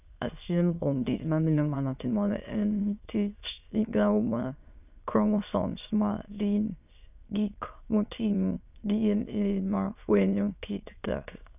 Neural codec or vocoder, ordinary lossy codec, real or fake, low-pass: autoencoder, 22.05 kHz, a latent of 192 numbers a frame, VITS, trained on many speakers; none; fake; 3.6 kHz